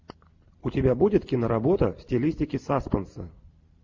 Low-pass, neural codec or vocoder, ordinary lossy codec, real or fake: 7.2 kHz; none; MP3, 48 kbps; real